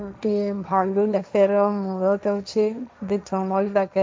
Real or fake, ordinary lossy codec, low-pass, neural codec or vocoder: fake; none; none; codec, 16 kHz, 1.1 kbps, Voila-Tokenizer